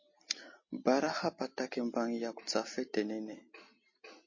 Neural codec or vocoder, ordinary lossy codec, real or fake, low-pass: none; MP3, 32 kbps; real; 7.2 kHz